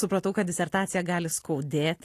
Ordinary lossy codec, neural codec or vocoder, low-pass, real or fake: AAC, 48 kbps; none; 14.4 kHz; real